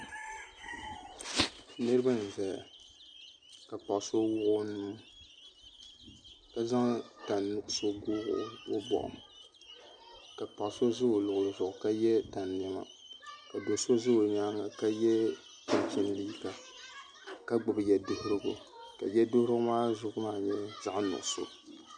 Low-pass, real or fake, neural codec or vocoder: 9.9 kHz; real; none